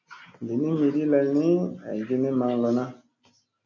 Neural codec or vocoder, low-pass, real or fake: none; 7.2 kHz; real